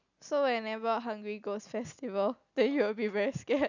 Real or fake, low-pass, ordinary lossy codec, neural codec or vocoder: real; 7.2 kHz; none; none